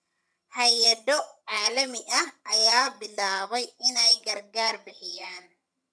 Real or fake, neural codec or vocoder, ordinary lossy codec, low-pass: fake; vocoder, 22.05 kHz, 80 mel bands, HiFi-GAN; none; none